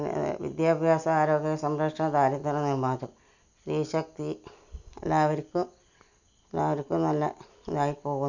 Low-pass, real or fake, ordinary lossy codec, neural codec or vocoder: 7.2 kHz; real; none; none